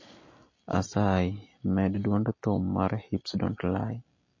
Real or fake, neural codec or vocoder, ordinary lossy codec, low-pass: real; none; MP3, 32 kbps; 7.2 kHz